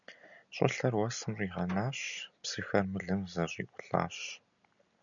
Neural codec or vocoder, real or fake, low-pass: none; real; 7.2 kHz